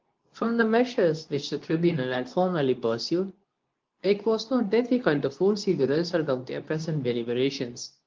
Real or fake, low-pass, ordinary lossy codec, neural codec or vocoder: fake; 7.2 kHz; Opus, 32 kbps; codec, 24 kHz, 0.9 kbps, WavTokenizer, medium speech release version 2